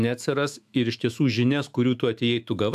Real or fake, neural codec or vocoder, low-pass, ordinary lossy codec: fake; autoencoder, 48 kHz, 128 numbers a frame, DAC-VAE, trained on Japanese speech; 14.4 kHz; AAC, 96 kbps